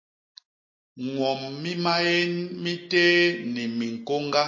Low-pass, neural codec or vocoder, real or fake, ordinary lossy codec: 7.2 kHz; none; real; MP3, 32 kbps